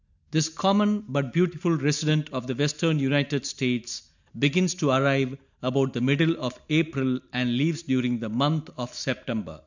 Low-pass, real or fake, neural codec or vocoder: 7.2 kHz; real; none